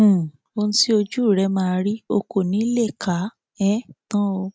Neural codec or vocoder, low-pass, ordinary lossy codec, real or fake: none; none; none; real